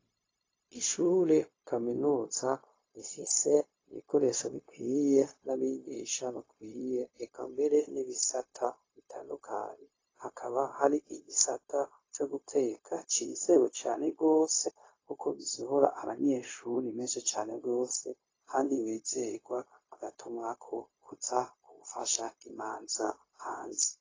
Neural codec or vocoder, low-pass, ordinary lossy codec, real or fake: codec, 16 kHz, 0.4 kbps, LongCat-Audio-Codec; 7.2 kHz; AAC, 32 kbps; fake